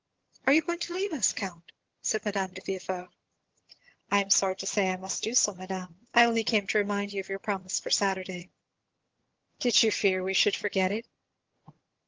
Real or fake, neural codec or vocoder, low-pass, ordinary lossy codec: fake; vocoder, 22.05 kHz, 80 mel bands, HiFi-GAN; 7.2 kHz; Opus, 16 kbps